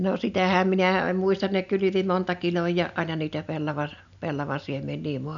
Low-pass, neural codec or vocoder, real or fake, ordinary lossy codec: 7.2 kHz; none; real; none